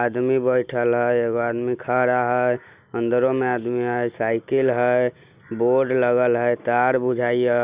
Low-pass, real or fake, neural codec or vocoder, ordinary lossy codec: 3.6 kHz; real; none; Opus, 64 kbps